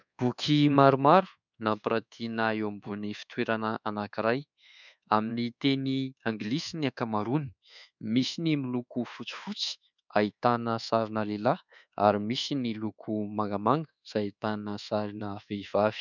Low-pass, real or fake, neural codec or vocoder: 7.2 kHz; fake; codec, 24 kHz, 1.2 kbps, DualCodec